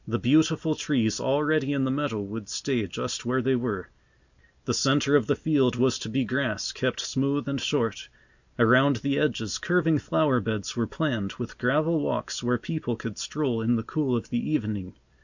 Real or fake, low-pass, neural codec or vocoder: real; 7.2 kHz; none